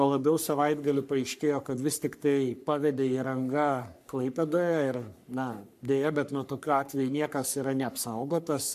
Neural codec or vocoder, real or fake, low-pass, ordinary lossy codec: codec, 44.1 kHz, 3.4 kbps, Pupu-Codec; fake; 14.4 kHz; MP3, 96 kbps